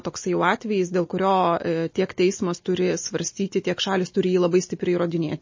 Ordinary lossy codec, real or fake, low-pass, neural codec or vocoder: MP3, 32 kbps; real; 7.2 kHz; none